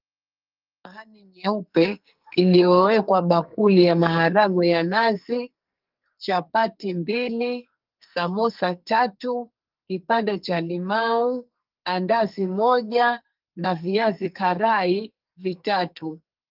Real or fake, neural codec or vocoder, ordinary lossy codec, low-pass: fake; codec, 44.1 kHz, 2.6 kbps, SNAC; Opus, 24 kbps; 5.4 kHz